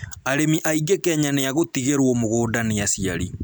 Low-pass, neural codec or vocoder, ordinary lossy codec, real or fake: none; none; none; real